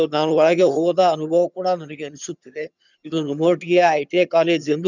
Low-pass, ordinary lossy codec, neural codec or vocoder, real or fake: 7.2 kHz; none; vocoder, 22.05 kHz, 80 mel bands, HiFi-GAN; fake